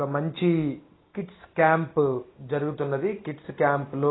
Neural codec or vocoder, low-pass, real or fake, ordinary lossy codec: none; 7.2 kHz; real; AAC, 16 kbps